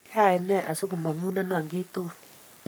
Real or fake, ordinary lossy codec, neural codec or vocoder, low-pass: fake; none; codec, 44.1 kHz, 3.4 kbps, Pupu-Codec; none